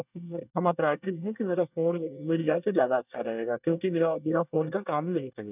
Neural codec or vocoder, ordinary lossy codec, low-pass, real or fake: codec, 24 kHz, 1 kbps, SNAC; none; 3.6 kHz; fake